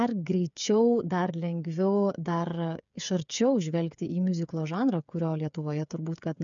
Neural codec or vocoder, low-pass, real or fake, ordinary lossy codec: codec, 16 kHz, 8 kbps, FreqCodec, smaller model; 7.2 kHz; fake; MP3, 96 kbps